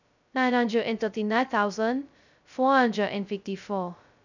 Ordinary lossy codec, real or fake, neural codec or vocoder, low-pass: none; fake; codec, 16 kHz, 0.2 kbps, FocalCodec; 7.2 kHz